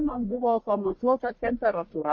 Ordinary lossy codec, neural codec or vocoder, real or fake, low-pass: MP3, 32 kbps; codec, 44.1 kHz, 1.7 kbps, Pupu-Codec; fake; 7.2 kHz